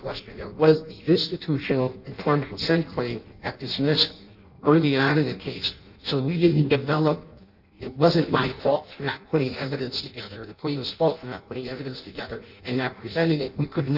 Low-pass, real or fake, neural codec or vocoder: 5.4 kHz; fake; codec, 16 kHz in and 24 kHz out, 0.6 kbps, FireRedTTS-2 codec